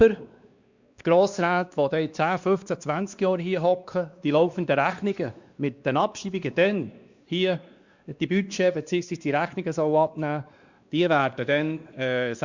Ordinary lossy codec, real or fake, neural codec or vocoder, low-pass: Opus, 64 kbps; fake; codec, 16 kHz, 2 kbps, X-Codec, WavLM features, trained on Multilingual LibriSpeech; 7.2 kHz